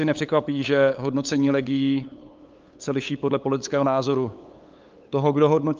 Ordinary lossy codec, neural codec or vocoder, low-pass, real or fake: Opus, 24 kbps; codec, 16 kHz, 8 kbps, FunCodec, trained on LibriTTS, 25 frames a second; 7.2 kHz; fake